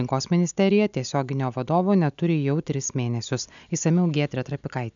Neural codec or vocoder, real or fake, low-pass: none; real; 7.2 kHz